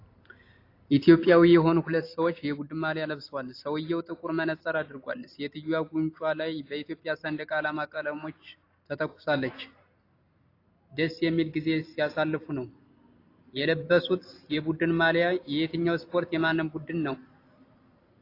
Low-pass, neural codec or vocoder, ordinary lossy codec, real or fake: 5.4 kHz; none; AAC, 32 kbps; real